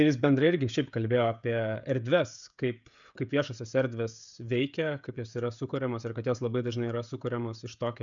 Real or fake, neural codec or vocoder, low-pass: fake; codec, 16 kHz, 16 kbps, FreqCodec, smaller model; 7.2 kHz